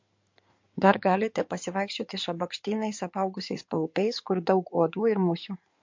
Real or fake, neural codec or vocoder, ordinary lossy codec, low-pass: fake; codec, 16 kHz in and 24 kHz out, 2.2 kbps, FireRedTTS-2 codec; MP3, 48 kbps; 7.2 kHz